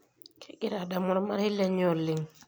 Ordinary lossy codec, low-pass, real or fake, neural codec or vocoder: none; none; real; none